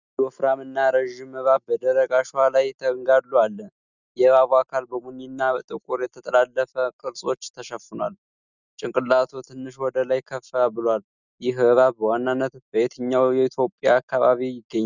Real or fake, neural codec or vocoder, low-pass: real; none; 7.2 kHz